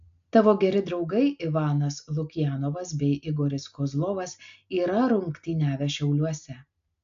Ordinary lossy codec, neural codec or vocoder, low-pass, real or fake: AAC, 64 kbps; none; 7.2 kHz; real